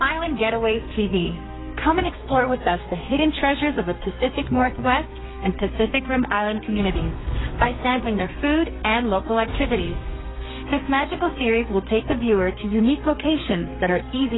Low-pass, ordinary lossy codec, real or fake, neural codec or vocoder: 7.2 kHz; AAC, 16 kbps; fake; codec, 32 kHz, 1.9 kbps, SNAC